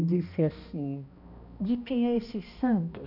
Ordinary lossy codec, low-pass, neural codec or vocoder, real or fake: none; 5.4 kHz; codec, 16 kHz, 1 kbps, X-Codec, HuBERT features, trained on balanced general audio; fake